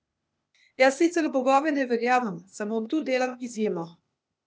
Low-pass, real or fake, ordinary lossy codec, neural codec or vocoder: none; fake; none; codec, 16 kHz, 0.8 kbps, ZipCodec